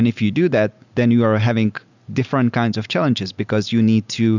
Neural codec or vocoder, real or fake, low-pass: none; real; 7.2 kHz